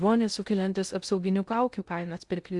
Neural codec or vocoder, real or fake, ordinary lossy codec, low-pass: codec, 16 kHz in and 24 kHz out, 0.6 kbps, FocalCodec, streaming, 2048 codes; fake; Opus, 64 kbps; 10.8 kHz